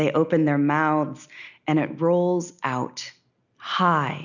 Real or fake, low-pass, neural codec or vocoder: real; 7.2 kHz; none